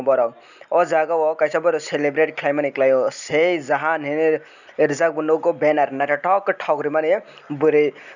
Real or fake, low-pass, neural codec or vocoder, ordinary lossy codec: real; 7.2 kHz; none; none